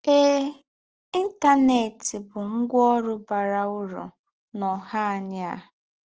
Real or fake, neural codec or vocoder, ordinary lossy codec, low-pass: real; none; Opus, 16 kbps; 7.2 kHz